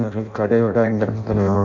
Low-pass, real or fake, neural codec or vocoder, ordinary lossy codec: 7.2 kHz; fake; codec, 16 kHz in and 24 kHz out, 0.6 kbps, FireRedTTS-2 codec; none